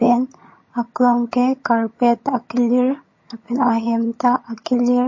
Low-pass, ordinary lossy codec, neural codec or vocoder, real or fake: 7.2 kHz; MP3, 32 kbps; vocoder, 22.05 kHz, 80 mel bands, HiFi-GAN; fake